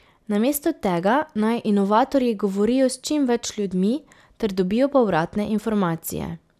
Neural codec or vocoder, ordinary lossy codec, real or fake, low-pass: none; none; real; 14.4 kHz